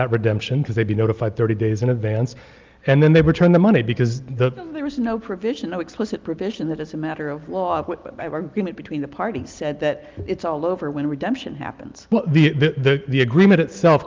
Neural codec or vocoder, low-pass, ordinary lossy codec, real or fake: none; 7.2 kHz; Opus, 32 kbps; real